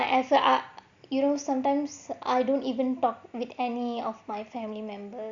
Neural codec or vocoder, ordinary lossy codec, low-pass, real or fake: none; Opus, 64 kbps; 7.2 kHz; real